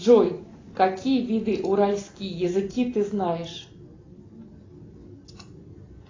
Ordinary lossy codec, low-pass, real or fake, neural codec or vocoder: AAC, 32 kbps; 7.2 kHz; real; none